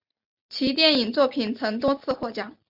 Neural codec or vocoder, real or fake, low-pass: none; real; 5.4 kHz